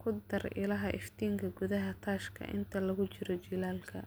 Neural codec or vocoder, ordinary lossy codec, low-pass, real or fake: none; none; none; real